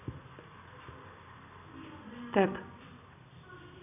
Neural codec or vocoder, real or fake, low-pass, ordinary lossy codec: none; real; 3.6 kHz; none